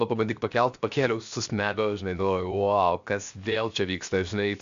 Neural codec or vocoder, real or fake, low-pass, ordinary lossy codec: codec, 16 kHz, 0.7 kbps, FocalCodec; fake; 7.2 kHz; MP3, 64 kbps